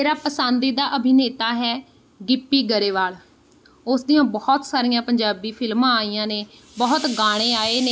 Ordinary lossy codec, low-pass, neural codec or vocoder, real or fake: none; none; none; real